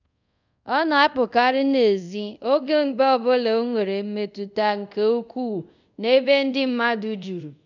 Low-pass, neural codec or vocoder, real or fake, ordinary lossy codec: 7.2 kHz; codec, 24 kHz, 0.5 kbps, DualCodec; fake; none